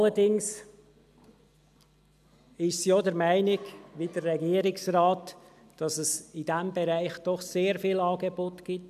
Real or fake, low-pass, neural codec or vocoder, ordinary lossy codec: real; 14.4 kHz; none; none